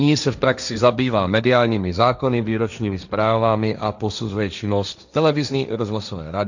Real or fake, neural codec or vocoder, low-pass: fake; codec, 16 kHz, 1.1 kbps, Voila-Tokenizer; 7.2 kHz